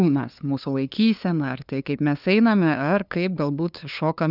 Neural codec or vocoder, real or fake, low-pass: codec, 16 kHz, 2 kbps, FunCodec, trained on LibriTTS, 25 frames a second; fake; 5.4 kHz